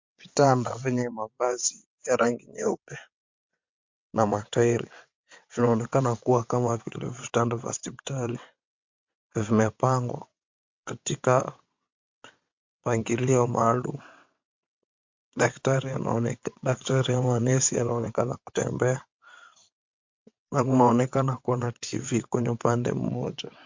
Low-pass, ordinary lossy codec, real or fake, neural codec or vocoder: 7.2 kHz; MP3, 48 kbps; fake; vocoder, 22.05 kHz, 80 mel bands, WaveNeXt